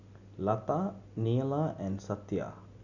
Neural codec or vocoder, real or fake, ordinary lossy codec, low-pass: none; real; none; 7.2 kHz